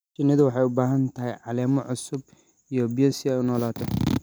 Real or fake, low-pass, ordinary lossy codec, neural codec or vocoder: real; none; none; none